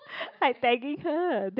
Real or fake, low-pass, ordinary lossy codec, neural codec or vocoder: real; 5.4 kHz; none; none